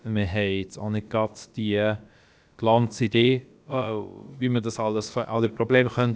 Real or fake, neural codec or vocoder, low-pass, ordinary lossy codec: fake; codec, 16 kHz, about 1 kbps, DyCAST, with the encoder's durations; none; none